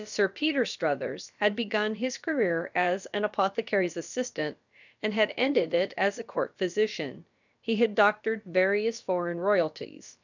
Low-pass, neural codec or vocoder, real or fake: 7.2 kHz; codec, 16 kHz, about 1 kbps, DyCAST, with the encoder's durations; fake